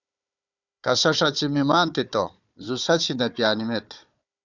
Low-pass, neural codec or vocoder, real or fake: 7.2 kHz; codec, 16 kHz, 4 kbps, FunCodec, trained on Chinese and English, 50 frames a second; fake